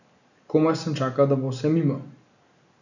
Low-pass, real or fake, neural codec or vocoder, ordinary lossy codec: 7.2 kHz; real; none; none